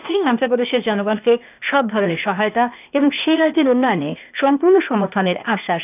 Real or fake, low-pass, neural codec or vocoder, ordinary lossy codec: fake; 3.6 kHz; codec, 16 kHz, 0.8 kbps, ZipCodec; none